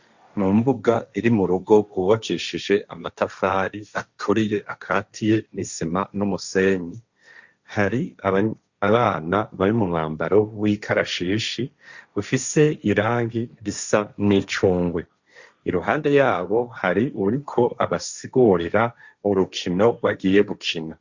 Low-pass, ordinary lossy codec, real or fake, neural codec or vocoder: 7.2 kHz; Opus, 64 kbps; fake; codec, 16 kHz, 1.1 kbps, Voila-Tokenizer